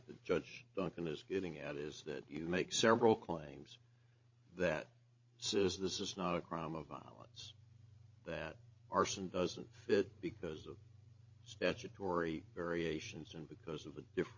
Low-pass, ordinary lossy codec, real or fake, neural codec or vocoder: 7.2 kHz; MP3, 32 kbps; fake; codec, 16 kHz, 16 kbps, FreqCodec, larger model